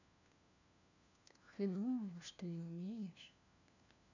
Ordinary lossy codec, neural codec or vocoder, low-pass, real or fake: none; codec, 16 kHz, 1 kbps, FunCodec, trained on LibriTTS, 50 frames a second; 7.2 kHz; fake